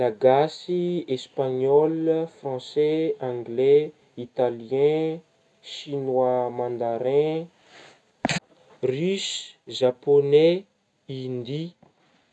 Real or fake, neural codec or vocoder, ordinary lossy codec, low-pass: real; none; none; none